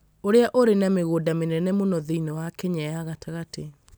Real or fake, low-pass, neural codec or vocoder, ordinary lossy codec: real; none; none; none